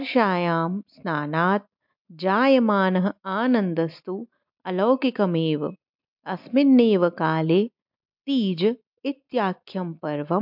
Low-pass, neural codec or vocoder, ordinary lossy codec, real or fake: 5.4 kHz; none; MP3, 48 kbps; real